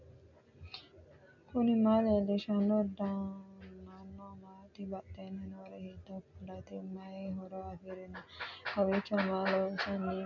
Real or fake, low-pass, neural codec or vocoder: real; 7.2 kHz; none